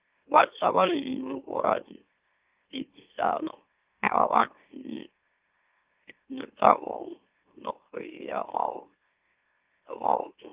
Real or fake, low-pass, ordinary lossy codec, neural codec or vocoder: fake; 3.6 kHz; Opus, 24 kbps; autoencoder, 44.1 kHz, a latent of 192 numbers a frame, MeloTTS